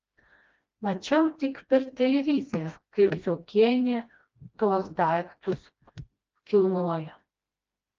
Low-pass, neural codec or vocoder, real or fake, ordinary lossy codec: 7.2 kHz; codec, 16 kHz, 1 kbps, FreqCodec, smaller model; fake; Opus, 24 kbps